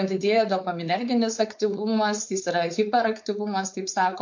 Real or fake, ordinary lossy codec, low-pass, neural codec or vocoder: fake; MP3, 48 kbps; 7.2 kHz; codec, 16 kHz, 4.8 kbps, FACodec